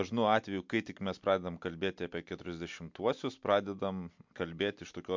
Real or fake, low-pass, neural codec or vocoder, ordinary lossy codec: real; 7.2 kHz; none; MP3, 64 kbps